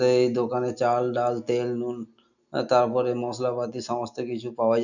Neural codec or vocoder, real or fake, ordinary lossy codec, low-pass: none; real; none; 7.2 kHz